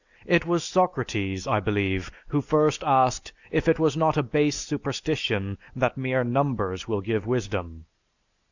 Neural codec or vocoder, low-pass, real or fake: none; 7.2 kHz; real